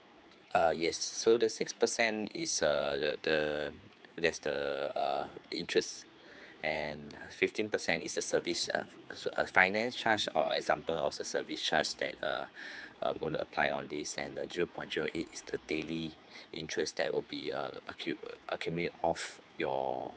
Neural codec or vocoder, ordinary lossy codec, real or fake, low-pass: codec, 16 kHz, 4 kbps, X-Codec, HuBERT features, trained on general audio; none; fake; none